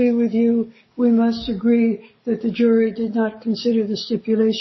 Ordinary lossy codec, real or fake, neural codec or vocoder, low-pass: MP3, 24 kbps; fake; codec, 44.1 kHz, 7.8 kbps, DAC; 7.2 kHz